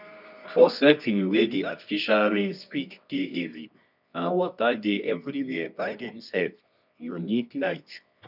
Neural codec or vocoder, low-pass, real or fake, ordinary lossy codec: codec, 24 kHz, 0.9 kbps, WavTokenizer, medium music audio release; 5.4 kHz; fake; none